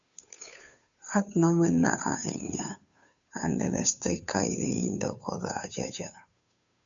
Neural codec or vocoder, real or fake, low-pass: codec, 16 kHz, 2 kbps, FunCodec, trained on Chinese and English, 25 frames a second; fake; 7.2 kHz